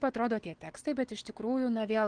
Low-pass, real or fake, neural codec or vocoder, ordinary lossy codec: 9.9 kHz; real; none; Opus, 16 kbps